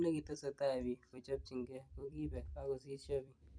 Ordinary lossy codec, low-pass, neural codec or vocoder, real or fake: none; 9.9 kHz; vocoder, 44.1 kHz, 128 mel bands every 256 samples, BigVGAN v2; fake